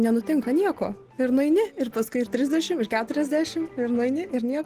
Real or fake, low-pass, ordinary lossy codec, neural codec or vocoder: real; 14.4 kHz; Opus, 16 kbps; none